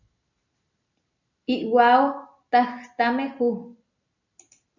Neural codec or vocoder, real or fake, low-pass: none; real; 7.2 kHz